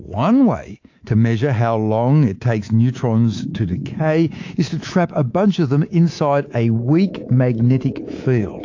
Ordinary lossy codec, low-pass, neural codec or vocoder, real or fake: AAC, 48 kbps; 7.2 kHz; codec, 24 kHz, 3.1 kbps, DualCodec; fake